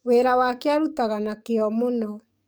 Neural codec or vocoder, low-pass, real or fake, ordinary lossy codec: codec, 44.1 kHz, 7.8 kbps, DAC; none; fake; none